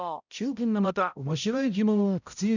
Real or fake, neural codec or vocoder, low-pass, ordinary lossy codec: fake; codec, 16 kHz, 0.5 kbps, X-Codec, HuBERT features, trained on balanced general audio; 7.2 kHz; none